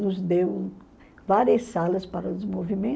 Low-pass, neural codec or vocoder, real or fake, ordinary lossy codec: none; none; real; none